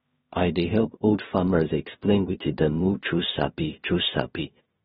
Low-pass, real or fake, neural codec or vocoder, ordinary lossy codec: 10.8 kHz; fake; codec, 16 kHz in and 24 kHz out, 0.4 kbps, LongCat-Audio-Codec, two codebook decoder; AAC, 16 kbps